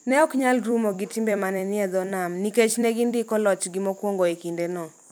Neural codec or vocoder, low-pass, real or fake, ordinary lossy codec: vocoder, 44.1 kHz, 128 mel bands every 256 samples, BigVGAN v2; none; fake; none